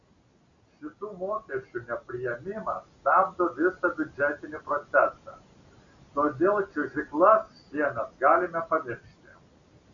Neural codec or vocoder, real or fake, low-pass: none; real; 7.2 kHz